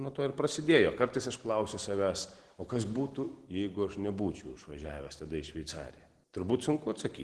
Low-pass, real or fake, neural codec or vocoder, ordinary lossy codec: 10.8 kHz; real; none; Opus, 16 kbps